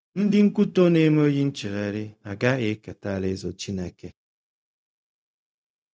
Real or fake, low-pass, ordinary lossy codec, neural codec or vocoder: fake; none; none; codec, 16 kHz, 0.4 kbps, LongCat-Audio-Codec